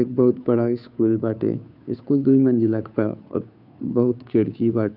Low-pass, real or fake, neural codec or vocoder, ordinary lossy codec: 5.4 kHz; fake; codec, 16 kHz, 2 kbps, FunCodec, trained on Chinese and English, 25 frames a second; none